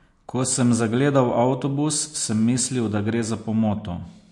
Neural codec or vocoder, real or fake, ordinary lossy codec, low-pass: none; real; MP3, 48 kbps; 10.8 kHz